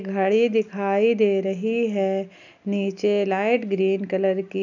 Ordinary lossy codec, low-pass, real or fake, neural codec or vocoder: none; 7.2 kHz; real; none